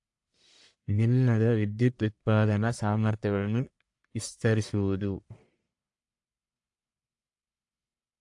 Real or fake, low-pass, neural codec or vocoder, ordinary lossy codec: fake; 10.8 kHz; codec, 44.1 kHz, 1.7 kbps, Pupu-Codec; AAC, 64 kbps